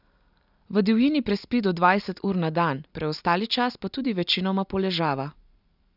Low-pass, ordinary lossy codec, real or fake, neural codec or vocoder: 5.4 kHz; none; real; none